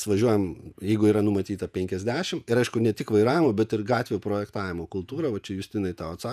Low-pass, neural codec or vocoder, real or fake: 14.4 kHz; vocoder, 48 kHz, 128 mel bands, Vocos; fake